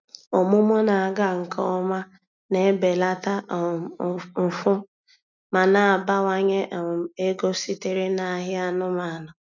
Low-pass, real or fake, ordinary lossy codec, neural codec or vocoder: 7.2 kHz; real; none; none